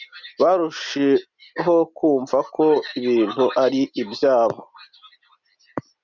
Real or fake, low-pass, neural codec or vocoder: real; 7.2 kHz; none